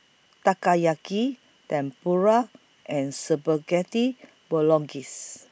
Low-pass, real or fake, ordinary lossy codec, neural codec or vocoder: none; real; none; none